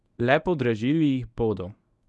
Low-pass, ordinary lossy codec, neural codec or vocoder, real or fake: none; none; codec, 24 kHz, 0.9 kbps, WavTokenizer, medium speech release version 1; fake